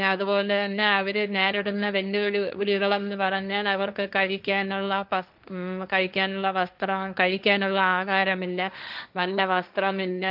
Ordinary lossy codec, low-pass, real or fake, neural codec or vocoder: none; 5.4 kHz; fake; codec, 16 kHz, 1.1 kbps, Voila-Tokenizer